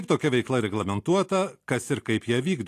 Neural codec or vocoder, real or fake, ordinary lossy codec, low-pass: none; real; AAC, 64 kbps; 14.4 kHz